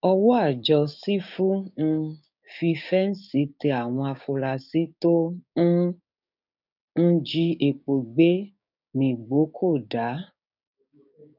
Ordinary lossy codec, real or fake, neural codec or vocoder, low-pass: none; fake; codec, 16 kHz, 6 kbps, DAC; 5.4 kHz